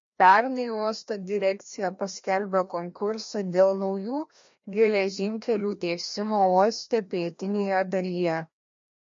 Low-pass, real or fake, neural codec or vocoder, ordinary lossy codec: 7.2 kHz; fake; codec, 16 kHz, 1 kbps, FreqCodec, larger model; MP3, 48 kbps